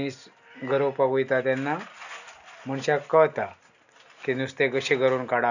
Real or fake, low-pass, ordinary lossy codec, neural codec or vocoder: real; 7.2 kHz; none; none